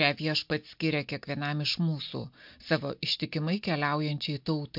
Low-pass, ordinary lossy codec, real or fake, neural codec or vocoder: 5.4 kHz; MP3, 48 kbps; real; none